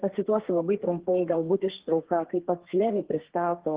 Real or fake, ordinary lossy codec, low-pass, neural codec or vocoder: fake; Opus, 16 kbps; 3.6 kHz; codec, 32 kHz, 1.9 kbps, SNAC